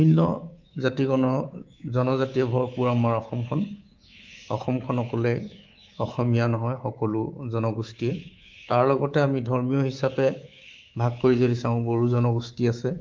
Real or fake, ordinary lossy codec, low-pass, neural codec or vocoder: fake; Opus, 32 kbps; 7.2 kHz; codec, 24 kHz, 3.1 kbps, DualCodec